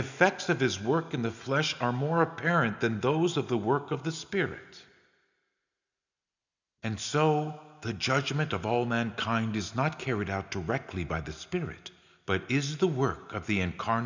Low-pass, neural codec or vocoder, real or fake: 7.2 kHz; none; real